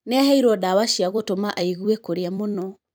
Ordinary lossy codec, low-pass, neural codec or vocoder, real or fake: none; none; vocoder, 44.1 kHz, 128 mel bands every 256 samples, BigVGAN v2; fake